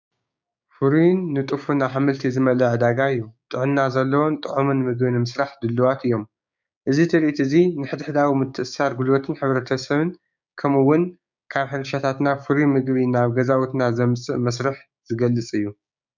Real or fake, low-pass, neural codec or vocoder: fake; 7.2 kHz; codec, 16 kHz, 6 kbps, DAC